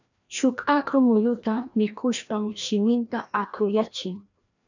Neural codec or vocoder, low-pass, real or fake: codec, 16 kHz, 1 kbps, FreqCodec, larger model; 7.2 kHz; fake